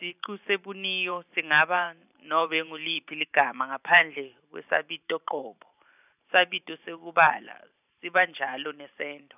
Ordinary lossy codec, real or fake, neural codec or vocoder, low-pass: none; fake; vocoder, 44.1 kHz, 128 mel bands every 512 samples, BigVGAN v2; 3.6 kHz